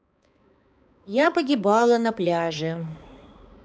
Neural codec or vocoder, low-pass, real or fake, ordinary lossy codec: codec, 16 kHz, 4 kbps, X-Codec, HuBERT features, trained on balanced general audio; none; fake; none